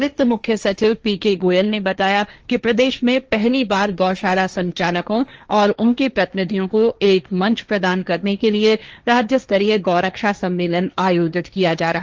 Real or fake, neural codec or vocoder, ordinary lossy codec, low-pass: fake; codec, 16 kHz, 1.1 kbps, Voila-Tokenizer; Opus, 24 kbps; 7.2 kHz